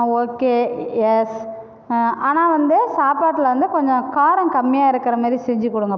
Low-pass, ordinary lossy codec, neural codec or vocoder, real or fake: none; none; none; real